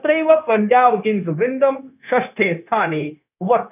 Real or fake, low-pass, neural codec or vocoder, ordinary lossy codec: fake; 3.6 kHz; codec, 16 kHz, 0.9 kbps, LongCat-Audio-Codec; none